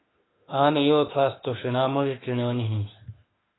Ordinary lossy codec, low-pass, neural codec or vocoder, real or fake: AAC, 16 kbps; 7.2 kHz; autoencoder, 48 kHz, 32 numbers a frame, DAC-VAE, trained on Japanese speech; fake